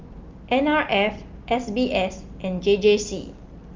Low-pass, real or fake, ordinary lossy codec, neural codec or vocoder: 7.2 kHz; real; Opus, 24 kbps; none